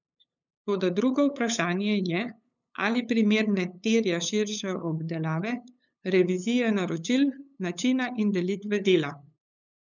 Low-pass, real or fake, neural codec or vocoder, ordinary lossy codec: 7.2 kHz; fake; codec, 16 kHz, 8 kbps, FunCodec, trained on LibriTTS, 25 frames a second; none